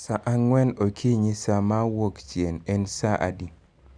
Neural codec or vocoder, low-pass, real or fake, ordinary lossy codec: none; 9.9 kHz; real; none